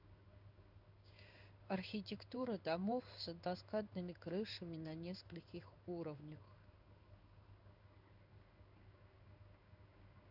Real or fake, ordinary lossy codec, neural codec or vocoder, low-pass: fake; Opus, 64 kbps; codec, 16 kHz in and 24 kHz out, 1 kbps, XY-Tokenizer; 5.4 kHz